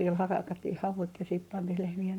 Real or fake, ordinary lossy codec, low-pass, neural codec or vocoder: fake; MP3, 96 kbps; 19.8 kHz; codec, 44.1 kHz, 7.8 kbps, Pupu-Codec